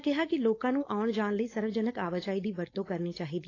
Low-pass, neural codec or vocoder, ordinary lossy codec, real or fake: 7.2 kHz; codec, 16 kHz, 4.8 kbps, FACodec; AAC, 32 kbps; fake